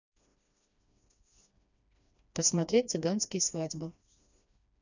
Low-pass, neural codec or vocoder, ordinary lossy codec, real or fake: 7.2 kHz; codec, 16 kHz, 2 kbps, FreqCodec, smaller model; none; fake